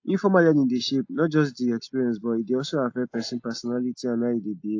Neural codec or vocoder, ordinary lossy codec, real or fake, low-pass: none; AAC, 48 kbps; real; 7.2 kHz